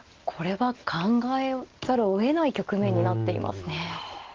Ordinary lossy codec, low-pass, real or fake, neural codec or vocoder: Opus, 16 kbps; 7.2 kHz; real; none